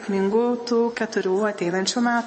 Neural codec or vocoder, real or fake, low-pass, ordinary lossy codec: vocoder, 24 kHz, 100 mel bands, Vocos; fake; 10.8 kHz; MP3, 32 kbps